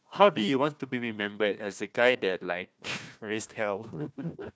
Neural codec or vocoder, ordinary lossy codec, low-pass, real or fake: codec, 16 kHz, 1 kbps, FunCodec, trained on Chinese and English, 50 frames a second; none; none; fake